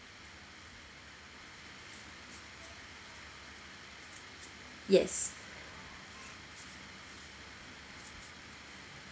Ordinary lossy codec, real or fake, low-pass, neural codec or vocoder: none; real; none; none